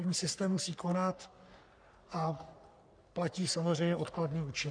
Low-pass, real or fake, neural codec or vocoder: 9.9 kHz; fake; codec, 44.1 kHz, 3.4 kbps, Pupu-Codec